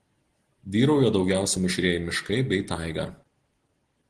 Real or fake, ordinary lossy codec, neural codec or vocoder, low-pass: real; Opus, 16 kbps; none; 10.8 kHz